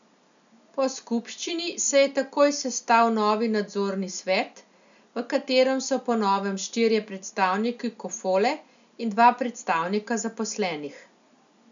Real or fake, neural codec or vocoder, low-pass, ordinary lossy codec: real; none; 7.2 kHz; none